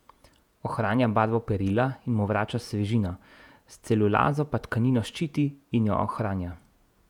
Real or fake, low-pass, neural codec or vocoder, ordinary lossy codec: fake; 19.8 kHz; vocoder, 48 kHz, 128 mel bands, Vocos; none